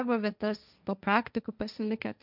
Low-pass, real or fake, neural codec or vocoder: 5.4 kHz; fake; codec, 16 kHz, 1.1 kbps, Voila-Tokenizer